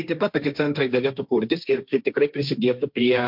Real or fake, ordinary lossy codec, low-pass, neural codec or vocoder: fake; MP3, 48 kbps; 5.4 kHz; codec, 16 kHz, 1.1 kbps, Voila-Tokenizer